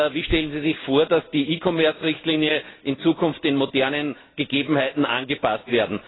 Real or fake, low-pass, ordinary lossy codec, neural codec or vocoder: real; 7.2 kHz; AAC, 16 kbps; none